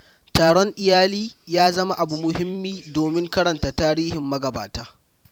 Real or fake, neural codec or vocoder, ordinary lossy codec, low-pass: fake; vocoder, 44.1 kHz, 128 mel bands every 512 samples, BigVGAN v2; none; 19.8 kHz